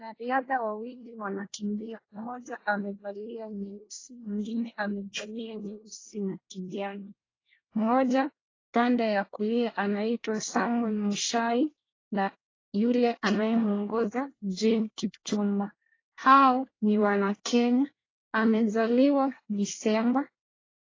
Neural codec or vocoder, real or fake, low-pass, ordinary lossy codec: codec, 24 kHz, 1 kbps, SNAC; fake; 7.2 kHz; AAC, 32 kbps